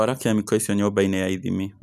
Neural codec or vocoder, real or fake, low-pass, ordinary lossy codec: none; real; 14.4 kHz; none